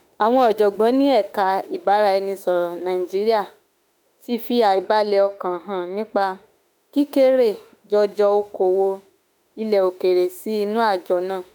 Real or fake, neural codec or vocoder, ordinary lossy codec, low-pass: fake; autoencoder, 48 kHz, 32 numbers a frame, DAC-VAE, trained on Japanese speech; none; none